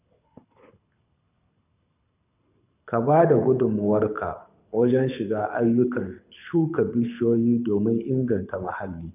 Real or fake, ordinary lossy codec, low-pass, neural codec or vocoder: fake; none; 3.6 kHz; codec, 24 kHz, 6 kbps, HILCodec